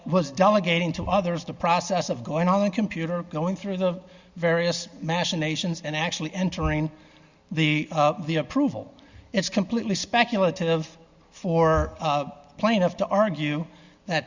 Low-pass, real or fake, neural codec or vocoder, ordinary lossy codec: 7.2 kHz; real; none; Opus, 64 kbps